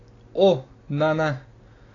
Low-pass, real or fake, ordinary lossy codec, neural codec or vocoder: 7.2 kHz; real; AAC, 32 kbps; none